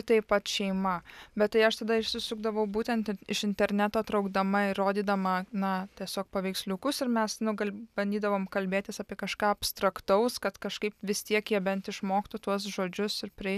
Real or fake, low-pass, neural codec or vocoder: real; 14.4 kHz; none